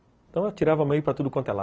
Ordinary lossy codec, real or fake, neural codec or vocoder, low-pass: none; real; none; none